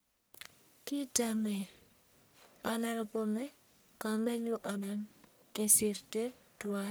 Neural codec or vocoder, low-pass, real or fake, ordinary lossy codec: codec, 44.1 kHz, 1.7 kbps, Pupu-Codec; none; fake; none